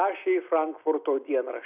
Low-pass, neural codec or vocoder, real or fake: 3.6 kHz; none; real